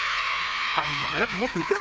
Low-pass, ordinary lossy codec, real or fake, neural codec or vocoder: none; none; fake; codec, 16 kHz, 2 kbps, FreqCodec, larger model